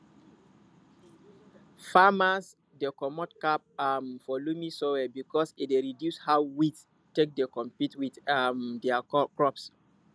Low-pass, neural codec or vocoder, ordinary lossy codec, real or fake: none; none; none; real